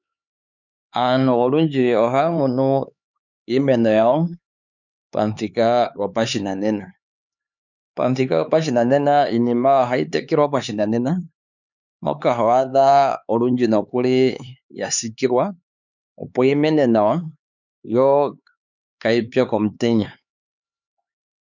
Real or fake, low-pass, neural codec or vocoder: fake; 7.2 kHz; codec, 16 kHz, 4 kbps, X-Codec, HuBERT features, trained on LibriSpeech